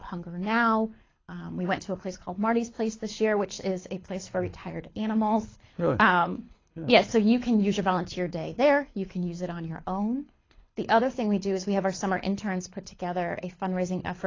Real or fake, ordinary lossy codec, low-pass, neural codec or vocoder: fake; AAC, 32 kbps; 7.2 kHz; codec, 24 kHz, 6 kbps, HILCodec